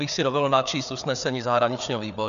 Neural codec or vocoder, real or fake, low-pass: codec, 16 kHz, 4 kbps, FreqCodec, larger model; fake; 7.2 kHz